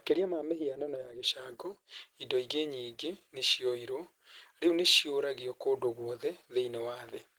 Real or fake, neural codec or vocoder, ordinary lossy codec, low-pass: real; none; Opus, 16 kbps; 19.8 kHz